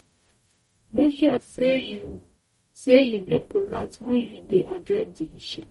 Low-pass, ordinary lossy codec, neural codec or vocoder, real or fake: 19.8 kHz; MP3, 48 kbps; codec, 44.1 kHz, 0.9 kbps, DAC; fake